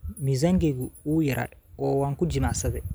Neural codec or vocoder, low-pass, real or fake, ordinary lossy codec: none; none; real; none